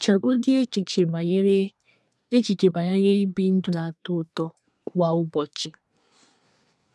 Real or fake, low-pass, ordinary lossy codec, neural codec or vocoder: fake; none; none; codec, 24 kHz, 1 kbps, SNAC